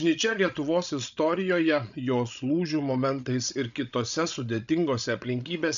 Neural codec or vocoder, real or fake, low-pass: codec, 16 kHz, 16 kbps, FreqCodec, larger model; fake; 7.2 kHz